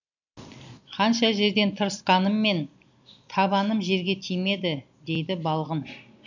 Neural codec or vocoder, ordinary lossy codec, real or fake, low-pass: none; none; real; 7.2 kHz